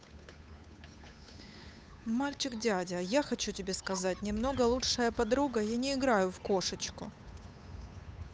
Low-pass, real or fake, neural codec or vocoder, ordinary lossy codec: none; fake; codec, 16 kHz, 8 kbps, FunCodec, trained on Chinese and English, 25 frames a second; none